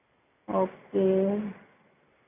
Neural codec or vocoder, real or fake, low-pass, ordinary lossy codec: none; real; 3.6 kHz; none